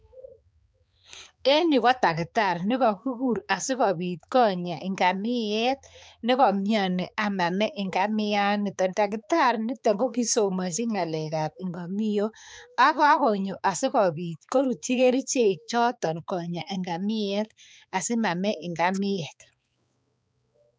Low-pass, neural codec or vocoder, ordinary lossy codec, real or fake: none; codec, 16 kHz, 4 kbps, X-Codec, HuBERT features, trained on balanced general audio; none; fake